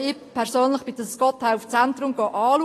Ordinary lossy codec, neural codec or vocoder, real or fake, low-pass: AAC, 48 kbps; none; real; 14.4 kHz